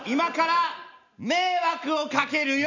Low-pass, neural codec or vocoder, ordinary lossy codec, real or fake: 7.2 kHz; none; none; real